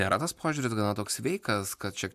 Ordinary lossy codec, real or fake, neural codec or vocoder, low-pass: MP3, 96 kbps; real; none; 14.4 kHz